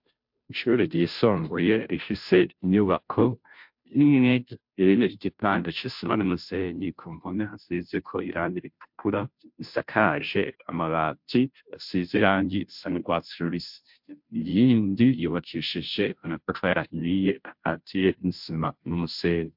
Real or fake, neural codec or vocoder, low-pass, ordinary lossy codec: fake; codec, 16 kHz, 0.5 kbps, FunCodec, trained on Chinese and English, 25 frames a second; 5.4 kHz; MP3, 48 kbps